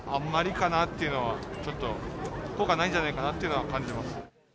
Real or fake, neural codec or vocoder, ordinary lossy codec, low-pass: real; none; none; none